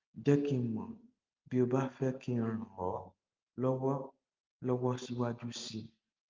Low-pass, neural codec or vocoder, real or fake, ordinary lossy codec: 7.2 kHz; none; real; Opus, 32 kbps